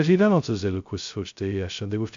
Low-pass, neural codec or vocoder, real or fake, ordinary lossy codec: 7.2 kHz; codec, 16 kHz, 0.2 kbps, FocalCodec; fake; AAC, 64 kbps